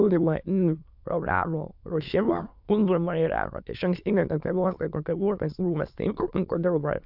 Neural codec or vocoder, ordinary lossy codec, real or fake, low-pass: autoencoder, 22.05 kHz, a latent of 192 numbers a frame, VITS, trained on many speakers; AAC, 48 kbps; fake; 5.4 kHz